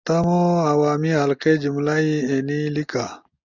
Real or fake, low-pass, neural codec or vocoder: real; 7.2 kHz; none